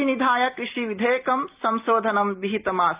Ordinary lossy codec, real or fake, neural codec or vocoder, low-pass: Opus, 32 kbps; real; none; 3.6 kHz